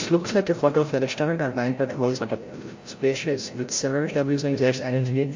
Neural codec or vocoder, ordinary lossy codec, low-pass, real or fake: codec, 16 kHz, 0.5 kbps, FreqCodec, larger model; MP3, 48 kbps; 7.2 kHz; fake